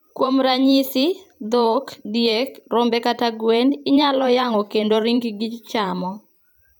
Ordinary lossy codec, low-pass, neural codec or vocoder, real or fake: none; none; vocoder, 44.1 kHz, 128 mel bands every 512 samples, BigVGAN v2; fake